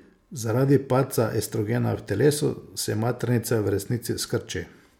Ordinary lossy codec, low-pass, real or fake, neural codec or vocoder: MP3, 96 kbps; 19.8 kHz; real; none